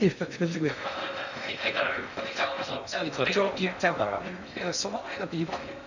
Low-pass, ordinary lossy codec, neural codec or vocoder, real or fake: 7.2 kHz; none; codec, 16 kHz in and 24 kHz out, 0.6 kbps, FocalCodec, streaming, 2048 codes; fake